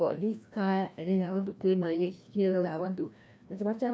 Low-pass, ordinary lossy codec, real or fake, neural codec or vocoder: none; none; fake; codec, 16 kHz, 1 kbps, FreqCodec, larger model